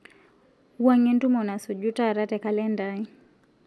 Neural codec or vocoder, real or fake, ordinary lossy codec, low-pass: none; real; none; none